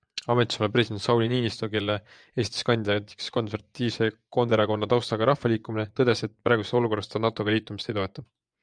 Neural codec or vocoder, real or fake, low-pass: vocoder, 24 kHz, 100 mel bands, Vocos; fake; 9.9 kHz